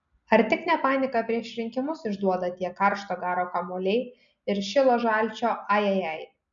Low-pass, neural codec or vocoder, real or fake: 7.2 kHz; none; real